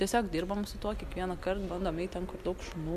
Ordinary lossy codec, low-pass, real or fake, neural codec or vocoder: MP3, 96 kbps; 14.4 kHz; real; none